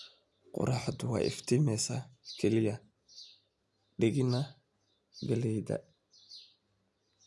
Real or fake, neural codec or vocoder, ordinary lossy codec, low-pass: real; none; none; none